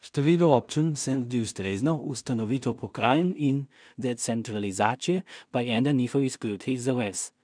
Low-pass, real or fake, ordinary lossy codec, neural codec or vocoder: 9.9 kHz; fake; none; codec, 16 kHz in and 24 kHz out, 0.4 kbps, LongCat-Audio-Codec, two codebook decoder